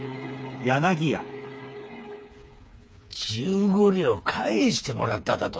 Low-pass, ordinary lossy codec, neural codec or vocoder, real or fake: none; none; codec, 16 kHz, 4 kbps, FreqCodec, smaller model; fake